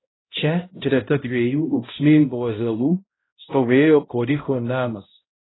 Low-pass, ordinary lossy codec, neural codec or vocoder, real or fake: 7.2 kHz; AAC, 16 kbps; codec, 16 kHz, 0.5 kbps, X-Codec, HuBERT features, trained on balanced general audio; fake